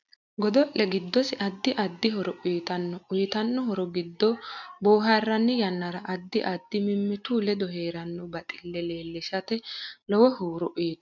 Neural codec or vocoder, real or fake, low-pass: none; real; 7.2 kHz